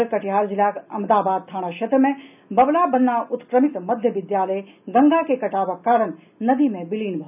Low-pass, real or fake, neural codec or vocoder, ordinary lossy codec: 3.6 kHz; real; none; none